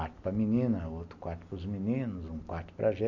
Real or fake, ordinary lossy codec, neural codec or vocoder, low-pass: real; none; none; 7.2 kHz